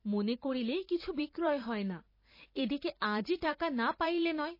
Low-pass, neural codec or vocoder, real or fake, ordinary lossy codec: 5.4 kHz; vocoder, 44.1 kHz, 128 mel bands every 512 samples, BigVGAN v2; fake; MP3, 24 kbps